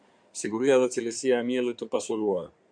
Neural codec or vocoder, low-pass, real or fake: codec, 16 kHz in and 24 kHz out, 2.2 kbps, FireRedTTS-2 codec; 9.9 kHz; fake